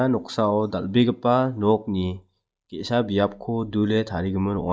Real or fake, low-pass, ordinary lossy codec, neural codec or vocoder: real; none; none; none